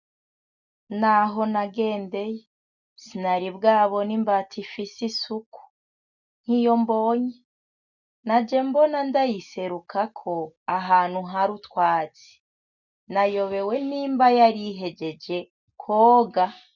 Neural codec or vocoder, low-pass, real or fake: none; 7.2 kHz; real